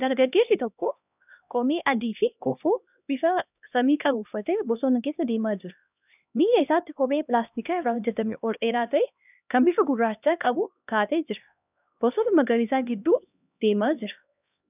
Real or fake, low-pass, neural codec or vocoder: fake; 3.6 kHz; codec, 16 kHz, 1 kbps, X-Codec, HuBERT features, trained on LibriSpeech